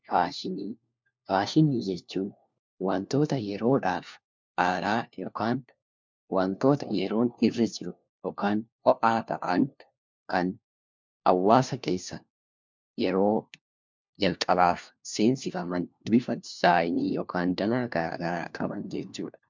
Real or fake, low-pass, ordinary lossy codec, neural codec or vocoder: fake; 7.2 kHz; MP3, 64 kbps; codec, 16 kHz, 1 kbps, FunCodec, trained on LibriTTS, 50 frames a second